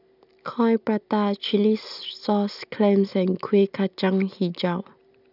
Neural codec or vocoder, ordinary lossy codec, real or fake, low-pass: none; none; real; 5.4 kHz